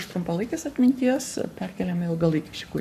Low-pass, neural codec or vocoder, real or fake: 14.4 kHz; codec, 44.1 kHz, 7.8 kbps, Pupu-Codec; fake